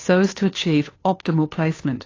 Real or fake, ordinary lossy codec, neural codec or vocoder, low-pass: fake; AAC, 32 kbps; codec, 16 kHz, 2 kbps, FunCodec, trained on Chinese and English, 25 frames a second; 7.2 kHz